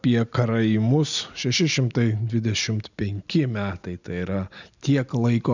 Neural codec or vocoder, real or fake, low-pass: none; real; 7.2 kHz